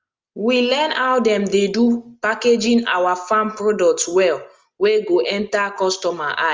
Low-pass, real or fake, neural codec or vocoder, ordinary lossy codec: 7.2 kHz; real; none; Opus, 32 kbps